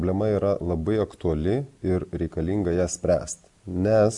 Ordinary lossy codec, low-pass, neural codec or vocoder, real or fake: MP3, 96 kbps; 10.8 kHz; none; real